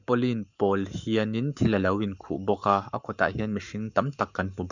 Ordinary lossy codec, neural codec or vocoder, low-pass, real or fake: none; codec, 44.1 kHz, 7.8 kbps, Pupu-Codec; 7.2 kHz; fake